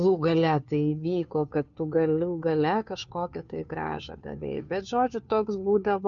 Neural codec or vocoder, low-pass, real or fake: codec, 16 kHz, 2 kbps, FunCodec, trained on Chinese and English, 25 frames a second; 7.2 kHz; fake